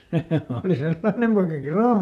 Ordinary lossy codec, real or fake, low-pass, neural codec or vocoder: none; fake; 14.4 kHz; vocoder, 44.1 kHz, 128 mel bands, Pupu-Vocoder